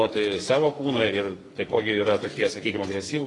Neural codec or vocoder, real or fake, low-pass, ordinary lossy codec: codec, 44.1 kHz, 2.6 kbps, SNAC; fake; 10.8 kHz; AAC, 32 kbps